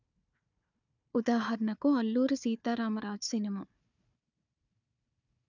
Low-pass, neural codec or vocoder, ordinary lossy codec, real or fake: 7.2 kHz; codec, 16 kHz, 4 kbps, FunCodec, trained on Chinese and English, 50 frames a second; none; fake